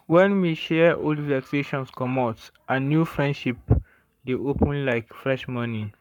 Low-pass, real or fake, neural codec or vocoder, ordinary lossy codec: 19.8 kHz; fake; codec, 44.1 kHz, 7.8 kbps, Pupu-Codec; none